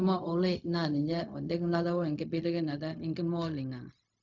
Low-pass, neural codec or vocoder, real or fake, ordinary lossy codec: 7.2 kHz; codec, 16 kHz, 0.4 kbps, LongCat-Audio-Codec; fake; none